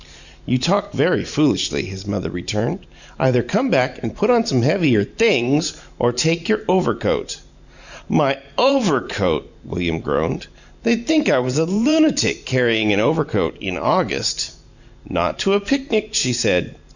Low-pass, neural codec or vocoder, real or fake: 7.2 kHz; vocoder, 44.1 kHz, 128 mel bands every 512 samples, BigVGAN v2; fake